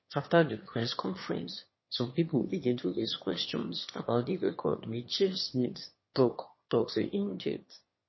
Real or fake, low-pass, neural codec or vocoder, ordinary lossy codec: fake; 7.2 kHz; autoencoder, 22.05 kHz, a latent of 192 numbers a frame, VITS, trained on one speaker; MP3, 24 kbps